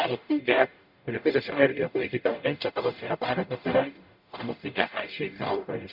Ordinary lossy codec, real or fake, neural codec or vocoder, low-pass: none; fake; codec, 44.1 kHz, 0.9 kbps, DAC; 5.4 kHz